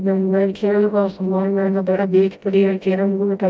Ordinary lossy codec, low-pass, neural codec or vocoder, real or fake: none; none; codec, 16 kHz, 0.5 kbps, FreqCodec, smaller model; fake